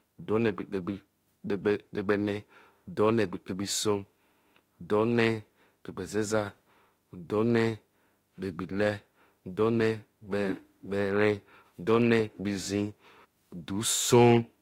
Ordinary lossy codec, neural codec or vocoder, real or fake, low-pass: AAC, 48 kbps; autoencoder, 48 kHz, 32 numbers a frame, DAC-VAE, trained on Japanese speech; fake; 19.8 kHz